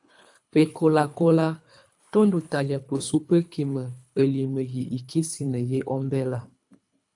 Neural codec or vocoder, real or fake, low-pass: codec, 24 kHz, 3 kbps, HILCodec; fake; 10.8 kHz